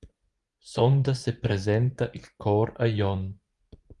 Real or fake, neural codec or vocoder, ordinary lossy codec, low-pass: fake; vocoder, 24 kHz, 100 mel bands, Vocos; Opus, 32 kbps; 10.8 kHz